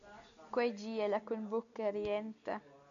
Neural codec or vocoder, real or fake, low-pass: none; real; 7.2 kHz